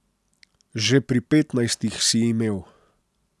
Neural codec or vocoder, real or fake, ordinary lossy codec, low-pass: none; real; none; none